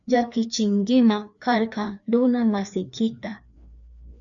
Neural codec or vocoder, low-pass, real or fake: codec, 16 kHz, 2 kbps, FreqCodec, larger model; 7.2 kHz; fake